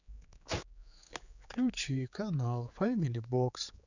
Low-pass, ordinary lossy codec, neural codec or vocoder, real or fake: 7.2 kHz; none; codec, 16 kHz, 4 kbps, X-Codec, HuBERT features, trained on general audio; fake